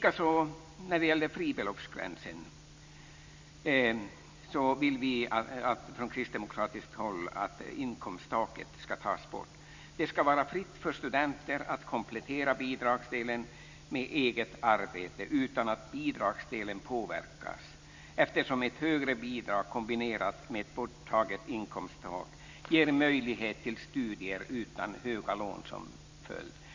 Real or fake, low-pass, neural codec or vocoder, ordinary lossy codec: real; 7.2 kHz; none; MP3, 64 kbps